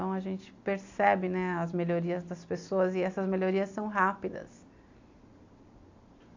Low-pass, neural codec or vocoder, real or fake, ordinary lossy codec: 7.2 kHz; none; real; AAC, 48 kbps